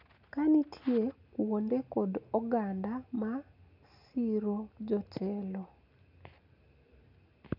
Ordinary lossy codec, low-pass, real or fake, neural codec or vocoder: none; 5.4 kHz; real; none